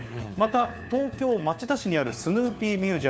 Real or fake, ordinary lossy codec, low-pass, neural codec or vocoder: fake; none; none; codec, 16 kHz, 4 kbps, FunCodec, trained on LibriTTS, 50 frames a second